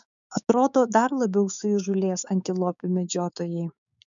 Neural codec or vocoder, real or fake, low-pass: codec, 16 kHz, 6 kbps, DAC; fake; 7.2 kHz